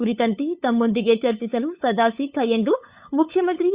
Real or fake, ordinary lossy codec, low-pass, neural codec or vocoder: fake; Opus, 64 kbps; 3.6 kHz; codec, 16 kHz, 8 kbps, FunCodec, trained on LibriTTS, 25 frames a second